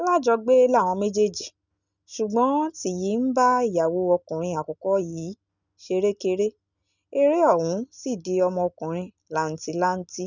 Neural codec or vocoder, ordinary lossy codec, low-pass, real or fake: none; none; 7.2 kHz; real